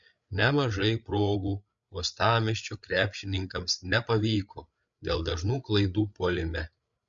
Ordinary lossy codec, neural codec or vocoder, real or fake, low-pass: MP3, 64 kbps; codec, 16 kHz, 8 kbps, FreqCodec, larger model; fake; 7.2 kHz